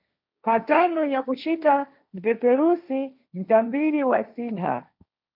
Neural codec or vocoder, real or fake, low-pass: codec, 16 kHz, 1.1 kbps, Voila-Tokenizer; fake; 5.4 kHz